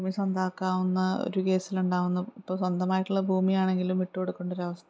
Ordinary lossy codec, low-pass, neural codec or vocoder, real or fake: none; none; none; real